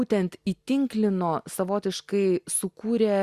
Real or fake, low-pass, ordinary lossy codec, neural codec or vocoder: real; 14.4 kHz; Opus, 64 kbps; none